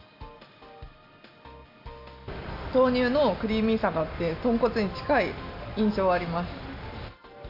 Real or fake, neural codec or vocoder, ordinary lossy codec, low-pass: real; none; none; 5.4 kHz